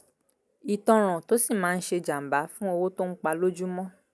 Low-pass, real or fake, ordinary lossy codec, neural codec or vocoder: none; real; none; none